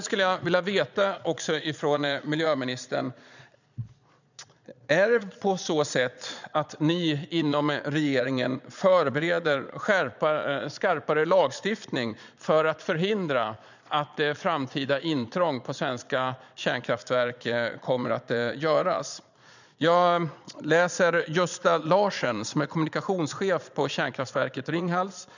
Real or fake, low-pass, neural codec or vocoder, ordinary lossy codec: fake; 7.2 kHz; vocoder, 22.05 kHz, 80 mel bands, Vocos; none